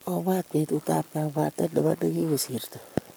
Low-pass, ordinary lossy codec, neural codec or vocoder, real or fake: none; none; codec, 44.1 kHz, 7.8 kbps, Pupu-Codec; fake